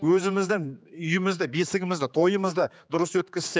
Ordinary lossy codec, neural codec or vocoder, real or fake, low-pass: none; codec, 16 kHz, 4 kbps, X-Codec, HuBERT features, trained on general audio; fake; none